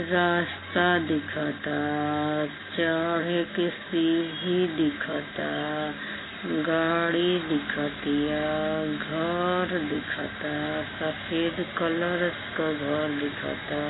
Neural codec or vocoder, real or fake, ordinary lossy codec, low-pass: none; real; AAC, 16 kbps; 7.2 kHz